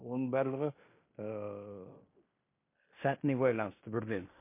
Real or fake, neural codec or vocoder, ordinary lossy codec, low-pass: fake; codec, 16 kHz in and 24 kHz out, 0.9 kbps, LongCat-Audio-Codec, fine tuned four codebook decoder; MP3, 24 kbps; 3.6 kHz